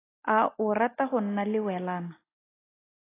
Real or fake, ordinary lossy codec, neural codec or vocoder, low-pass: real; AAC, 16 kbps; none; 3.6 kHz